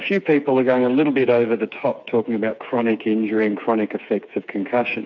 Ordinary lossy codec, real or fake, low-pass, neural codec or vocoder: MP3, 64 kbps; fake; 7.2 kHz; codec, 16 kHz, 4 kbps, FreqCodec, smaller model